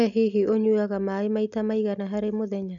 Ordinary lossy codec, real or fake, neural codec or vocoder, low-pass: none; real; none; 7.2 kHz